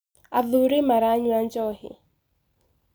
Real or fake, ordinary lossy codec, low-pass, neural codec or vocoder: real; none; none; none